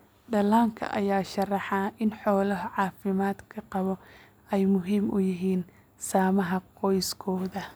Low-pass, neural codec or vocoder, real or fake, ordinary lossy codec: none; none; real; none